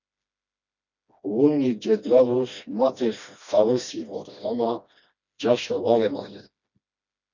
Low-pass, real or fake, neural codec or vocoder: 7.2 kHz; fake; codec, 16 kHz, 1 kbps, FreqCodec, smaller model